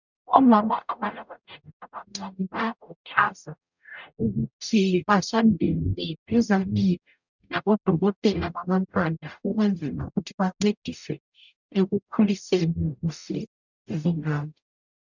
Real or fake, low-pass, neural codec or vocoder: fake; 7.2 kHz; codec, 44.1 kHz, 0.9 kbps, DAC